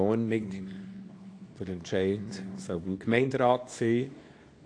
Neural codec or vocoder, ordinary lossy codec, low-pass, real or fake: codec, 24 kHz, 0.9 kbps, WavTokenizer, small release; AAC, 48 kbps; 9.9 kHz; fake